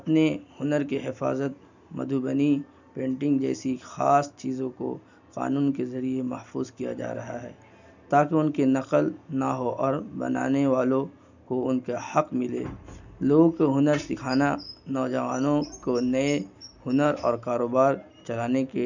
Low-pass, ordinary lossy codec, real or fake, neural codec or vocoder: 7.2 kHz; none; real; none